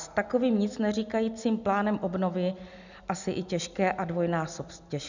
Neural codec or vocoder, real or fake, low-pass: none; real; 7.2 kHz